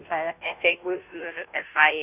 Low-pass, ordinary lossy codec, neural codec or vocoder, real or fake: 3.6 kHz; none; codec, 16 kHz, 0.5 kbps, FunCodec, trained on Chinese and English, 25 frames a second; fake